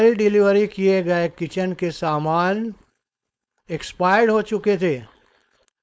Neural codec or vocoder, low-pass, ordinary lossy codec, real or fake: codec, 16 kHz, 4.8 kbps, FACodec; none; none; fake